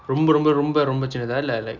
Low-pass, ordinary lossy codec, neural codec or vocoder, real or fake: 7.2 kHz; none; none; real